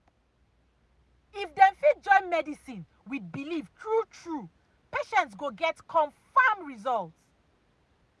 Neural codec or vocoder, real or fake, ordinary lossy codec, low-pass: none; real; none; none